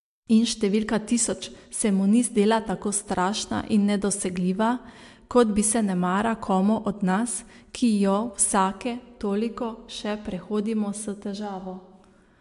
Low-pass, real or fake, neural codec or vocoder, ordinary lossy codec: 10.8 kHz; real; none; MP3, 64 kbps